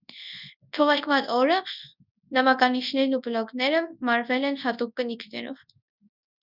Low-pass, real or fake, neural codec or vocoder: 5.4 kHz; fake; codec, 24 kHz, 0.9 kbps, WavTokenizer, large speech release